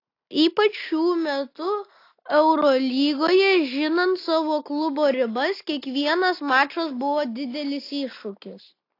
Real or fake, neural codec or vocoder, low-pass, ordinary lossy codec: real; none; 5.4 kHz; AAC, 32 kbps